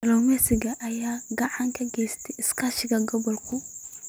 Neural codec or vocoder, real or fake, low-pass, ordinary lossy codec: none; real; none; none